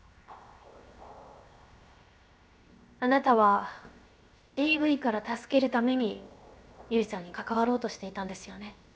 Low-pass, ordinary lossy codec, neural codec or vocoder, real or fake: none; none; codec, 16 kHz, 0.7 kbps, FocalCodec; fake